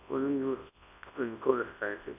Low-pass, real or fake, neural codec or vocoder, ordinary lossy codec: 3.6 kHz; fake; codec, 24 kHz, 0.9 kbps, WavTokenizer, large speech release; none